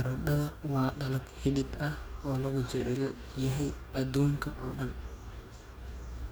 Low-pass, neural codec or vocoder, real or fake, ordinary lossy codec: none; codec, 44.1 kHz, 2.6 kbps, DAC; fake; none